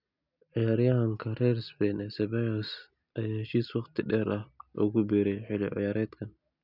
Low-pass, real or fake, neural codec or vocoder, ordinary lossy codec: 5.4 kHz; real; none; none